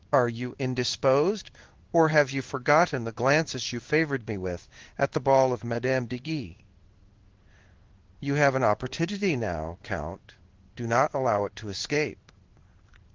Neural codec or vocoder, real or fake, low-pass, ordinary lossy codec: codec, 16 kHz in and 24 kHz out, 1 kbps, XY-Tokenizer; fake; 7.2 kHz; Opus, 16 kbps